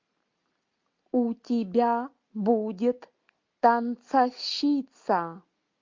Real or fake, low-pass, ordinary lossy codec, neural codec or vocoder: real; 7.2 kHz; MP3, 48 kbps; none